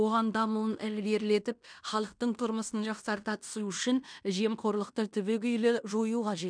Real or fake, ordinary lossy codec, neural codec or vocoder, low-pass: fake; MP3, 96 kbps; codec, 16 kHz in and 24 kHz out, 0.9 kbps, LongCat-Audio-Codec, fine tuned four codebook decoder; 9.9 kHz